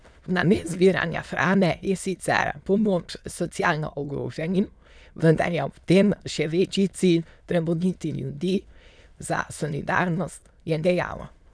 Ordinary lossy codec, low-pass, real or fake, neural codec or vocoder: none; none; fake; autoencoder, 22.05 kHz, a latent of 192 numbers a frame, VITS, trained on many speakers